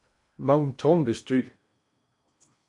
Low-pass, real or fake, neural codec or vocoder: 10.8 kHz; fake; codec, 16 kHz in and 24 kHz out, 0.8 kbps, FocalCodec, streaming, 65536 codes